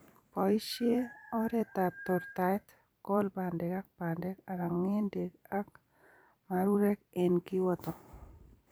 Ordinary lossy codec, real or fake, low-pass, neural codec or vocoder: none; fake; none; vocoder, 44.1 kHz, 128 mel bands every 512 samples, BigVGAN v2